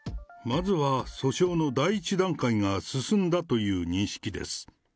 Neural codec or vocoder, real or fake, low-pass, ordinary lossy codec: none; real; none; none